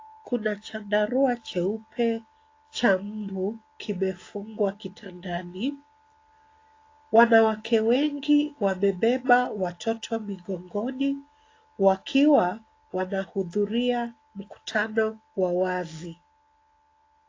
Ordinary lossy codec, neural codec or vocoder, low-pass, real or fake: AAC, 32 kbps; none; 7.2 kHz; real